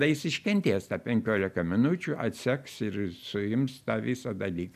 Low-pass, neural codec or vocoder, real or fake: 14.4 kHz; none; real